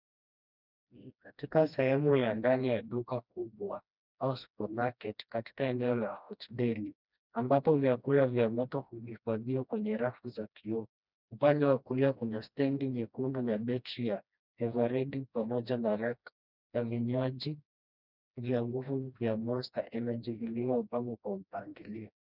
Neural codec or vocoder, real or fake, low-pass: codec, 16 kHz, 1 kbps, FreqCodec, smaller model; fake; 5.4 kHz